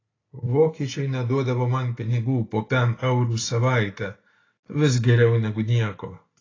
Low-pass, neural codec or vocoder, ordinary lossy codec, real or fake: 7.2 kHz; none; AAC, 32 kbps; real